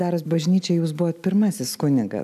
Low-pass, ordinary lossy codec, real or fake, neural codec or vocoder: 14.4 kHz; AAC, 96 kbps; real; none